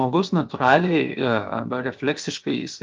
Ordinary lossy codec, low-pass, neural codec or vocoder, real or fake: Opus, 24 kbps; 7.2 kHz; codec, 16 kHz, 0.7 kbps, FocalCodec; fake